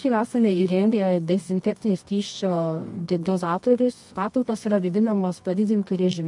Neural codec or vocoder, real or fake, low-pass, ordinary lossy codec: codec, 24 kHz, 0.9 kbps, WavTokenizer, medium music audio release; fake; 10.8 kHz; MP3, 64 kbps